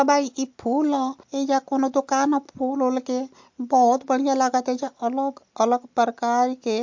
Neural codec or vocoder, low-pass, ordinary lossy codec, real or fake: none; 7.2 kHz; MP3, 48 kbps; real